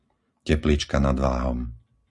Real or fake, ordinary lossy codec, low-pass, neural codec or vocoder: real; Opus, 64 kbps; 10.8 kHz; none